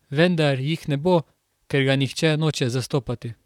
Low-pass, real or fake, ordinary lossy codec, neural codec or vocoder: 19.8 kHz; fake; none; vocoder, 44.1 kHz, 128 mel bands, Pupu-Vocoder